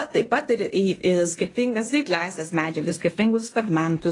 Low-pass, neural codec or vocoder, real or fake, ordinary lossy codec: 10.8 kHz; codec, 16 kHz in and 24 kHz out, 0.9 kbps, LongCat-Audio-Codec, fine tuned four codebook decoder; fake; AAC, 32 kbps